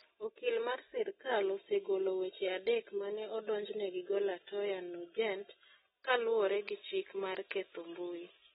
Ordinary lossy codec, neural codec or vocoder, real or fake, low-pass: AAC, 16 kbps; none; real; 19.8 kHz